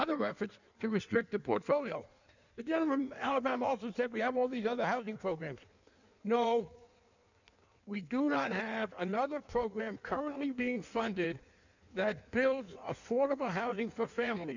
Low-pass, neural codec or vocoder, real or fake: 7.2 kHz; codec, 16 kHz in and 24 kHz out, 1.1 kbps, FireRedTTS-2 codec; fake